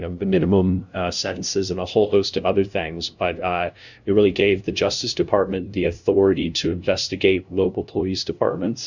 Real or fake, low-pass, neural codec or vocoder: fake; 7.2 kHz; codec, 16 kHz, 0.5 kbps, FunCodec, trained on LibriTTS, 25 frames a second